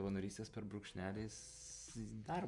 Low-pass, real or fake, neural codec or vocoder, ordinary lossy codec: 10.8 kHz; fake; vocoder, 48 kHz, 128 mel bands, Vocos; MP3, 96 kbps